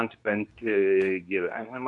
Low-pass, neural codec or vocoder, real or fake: 10.8 kHz; codec, 24 kHz, 0.9 kbps, WavTokenizer, medium speech release version 2; fake